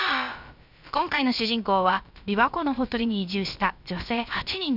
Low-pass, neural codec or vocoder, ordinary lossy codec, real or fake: 5.4 kHz; codec, 16 kHz, about 1 kbps, DyCAST, with the encoder's durations; none; fake